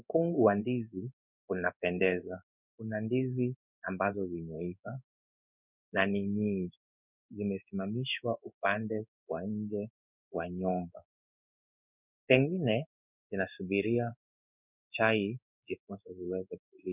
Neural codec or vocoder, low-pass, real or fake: codec, 16 kHz in and 24 kHz out, 1 kbps, XY-Tokenizer; 3.6 kHz; fake